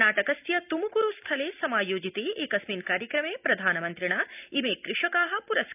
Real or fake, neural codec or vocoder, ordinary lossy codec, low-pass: real; none; none; 3.6 kHz